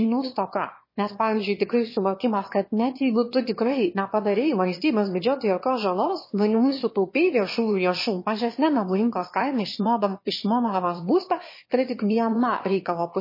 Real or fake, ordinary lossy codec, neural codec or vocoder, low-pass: fake; MP3, 24 kbps; autoencoder, 22.05 kHz, a latent of 192 numbers a frame, VITS, trained on one speaker; 5.4 kHz